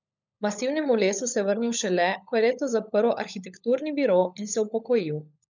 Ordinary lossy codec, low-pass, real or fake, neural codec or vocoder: none; 7.2 kHz; fake; codec, 16 kHz, 16 kbps, FunCodec, trained on LibriTTS, 50 frames a second